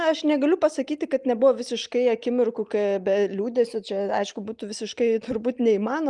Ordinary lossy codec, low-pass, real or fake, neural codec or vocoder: Opus, 32 kbps; 10.8 kHz; real; none